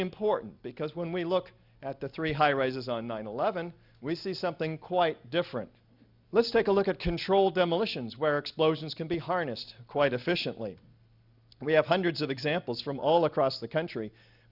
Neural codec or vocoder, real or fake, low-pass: none; real; 5.4 kHz